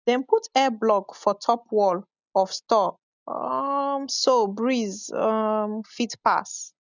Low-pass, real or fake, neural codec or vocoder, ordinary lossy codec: 7.2 kHz; real; none; none